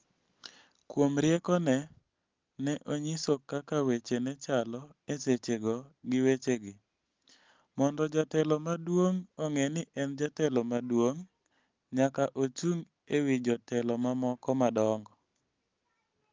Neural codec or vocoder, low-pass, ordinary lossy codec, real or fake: none; 7.2 kHz; Opus, 32 kbps; real